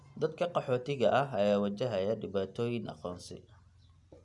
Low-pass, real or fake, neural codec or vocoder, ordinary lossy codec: 10.8 kHz; real; none; none